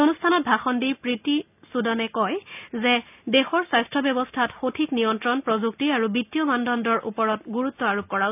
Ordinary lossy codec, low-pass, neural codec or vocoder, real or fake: none; 3.6 kHz; none; real